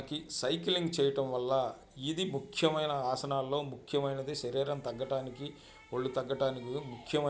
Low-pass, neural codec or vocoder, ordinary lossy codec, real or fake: none; none; none; real